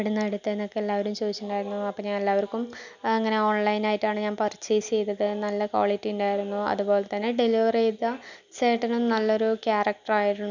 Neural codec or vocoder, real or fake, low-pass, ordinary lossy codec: none; real; 7.2 kHz; none